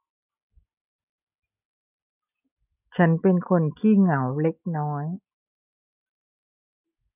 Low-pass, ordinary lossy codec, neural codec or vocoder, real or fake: 3.6 kHz; none; none; real